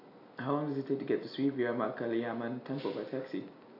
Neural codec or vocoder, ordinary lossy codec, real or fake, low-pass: none; AAC, 32 kbps; real; 5.4 kHz